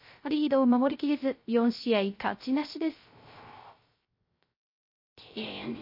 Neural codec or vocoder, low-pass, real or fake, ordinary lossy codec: codec, 16 kHz, 0.3 kbps, FocalCodec; 5.4 kHz; fake; MP3, 32 kbps